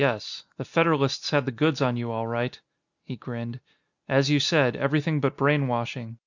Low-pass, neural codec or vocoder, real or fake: 7.2 kHz; none; real